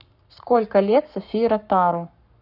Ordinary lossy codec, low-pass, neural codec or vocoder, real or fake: none; 5.4 kHz; codec, 44.1 kHz, 7.8 kbps, Pupu-Codec; fake